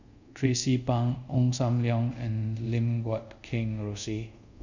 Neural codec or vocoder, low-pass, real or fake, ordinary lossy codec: codec, 24 kHz, 0.5 kbps, DualCodec; 7.2 kHz; fake; none